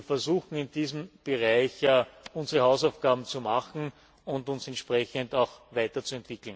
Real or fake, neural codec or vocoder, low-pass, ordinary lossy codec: real; none; none; none